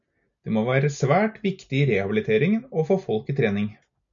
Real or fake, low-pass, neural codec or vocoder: real; 7.2 kHz; none